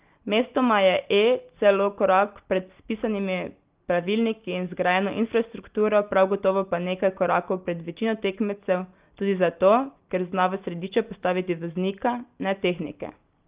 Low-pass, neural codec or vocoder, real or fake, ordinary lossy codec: 3.6 kHz; none; real; Opus, 32 kbps